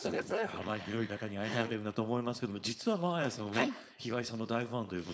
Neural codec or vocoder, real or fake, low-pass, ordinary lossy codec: codec, 16 kHz, 4.8 kbps, FACodec; fake; none; none